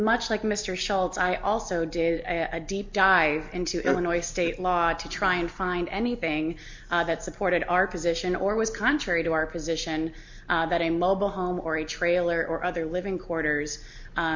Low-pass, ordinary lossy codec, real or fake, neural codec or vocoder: 7.2 kHz; MP3, 48 kbps; real; none